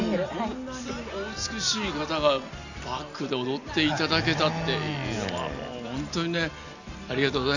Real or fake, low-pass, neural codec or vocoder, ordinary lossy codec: real; 7.2 kHz; none; none